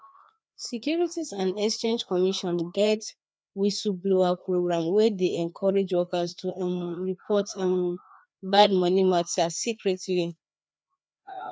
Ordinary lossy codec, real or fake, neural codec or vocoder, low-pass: none; fake; codec, 16 kHz, 2 kbps, FreqCodec, larger model; none